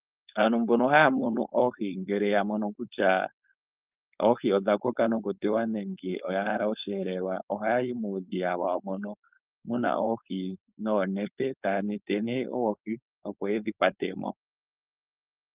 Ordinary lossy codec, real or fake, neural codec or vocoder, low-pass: Opus, 32 kbps; fake; codec, 16 kHz, 4.8 kbps, FACodec; 3.6 kHz